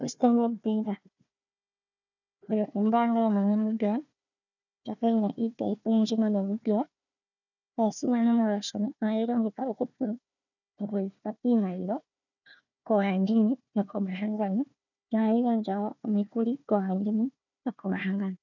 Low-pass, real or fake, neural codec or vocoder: 7.2 kHz; fake; codec, 16 kHz, 1 kbps, FunCodec, trained on Chinese and English, 50 frames a second